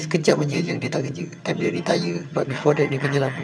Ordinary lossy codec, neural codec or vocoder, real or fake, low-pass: none; vocoder, 22.05 kHz, 80 mel bands, HiFi-GAN; fake; none